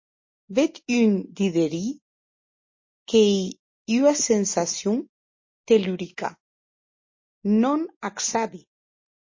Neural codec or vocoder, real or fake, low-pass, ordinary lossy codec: none; real; 7.2 kHz; MP3, 32 kbps